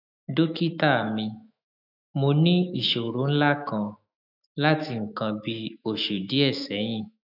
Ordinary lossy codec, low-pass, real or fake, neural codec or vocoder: none; 5.4 kHz; fake; autoencoder, 48 kHz, 128 numbers a frame, DAC-VAE, trained on Japanese speech